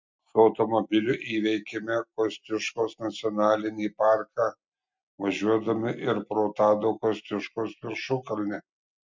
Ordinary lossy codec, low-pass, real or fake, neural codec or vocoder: MP3, 64 kbps; 7.2 kHz; real; none